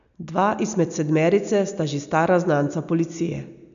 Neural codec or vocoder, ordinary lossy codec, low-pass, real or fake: none; none; 7.2 kHz; real